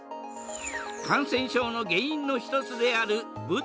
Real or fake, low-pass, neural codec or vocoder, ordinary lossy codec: real; none; none; none